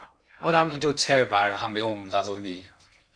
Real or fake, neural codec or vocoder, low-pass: fake; codec, 16 kHz in and 24 kHz out, 0.6 kbps, FocalCodec, streaming, 2048 codes; 9.9 kHz